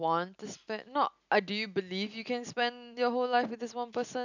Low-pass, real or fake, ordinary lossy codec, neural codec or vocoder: 7.2 kHz; real; none; none